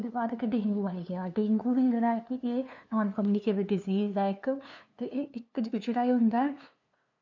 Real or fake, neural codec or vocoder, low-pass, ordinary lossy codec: fake; codec, 16 kHz, 2 kbps, FunCodec, trained on LibriTTS, 25 frames a second; 7.2 kHz; none